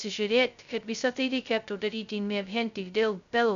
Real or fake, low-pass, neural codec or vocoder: fake; 7.2 kHz; codec, 16 kHz, 0.2 kbps, FocalCodec